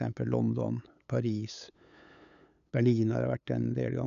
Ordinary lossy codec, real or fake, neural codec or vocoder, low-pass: none; fake; codec, 16 kHz, 8 kbps, FunCodec, trained on LibriTTS, 25 frames a second; 7.2 kHz